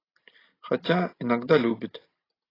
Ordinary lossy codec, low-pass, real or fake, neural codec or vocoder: AAC, 24 kbps; 5.4 kHz; real; none